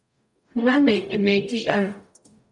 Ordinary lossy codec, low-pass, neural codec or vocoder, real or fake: MP3, 96 kbps; 10.8 kHz; codec, 44.1 kHz, 0.9 kbps, DAC; fake